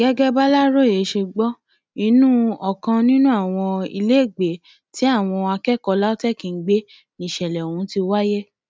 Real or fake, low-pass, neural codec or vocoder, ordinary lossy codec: real; none; none; none